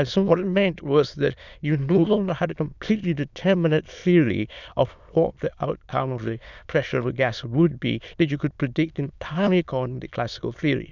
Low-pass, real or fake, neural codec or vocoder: 7.2 kHz; fake; autoencoder, 22.05 kHz, a latent of 192 numbers a frame, VITS, trained on many speakers